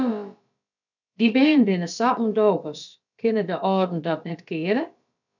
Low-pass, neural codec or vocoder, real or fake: 7.2 kHz; codec, 16 kHz, about 1 kbps, DyCAST, with the encoder's durations; fake